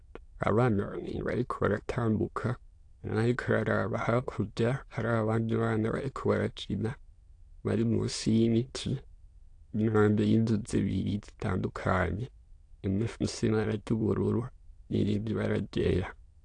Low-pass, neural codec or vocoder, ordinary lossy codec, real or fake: 9.9 kHz; autoencoder, 22.05 kHz, a latent of 192 numbers a frame, VITS, trained on many speakers; AAC, 48 kbps; fake